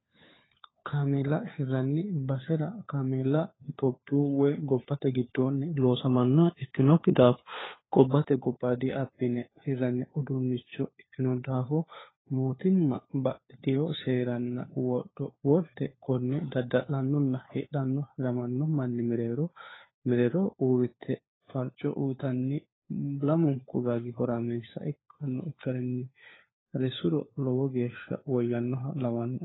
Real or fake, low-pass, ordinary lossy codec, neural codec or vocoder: fake; 7.2 kHz; AAC, 16 kbps; codec, 16 kHz, 4 kbps, FunCodec, trained on LibriTTS, 50 frames a second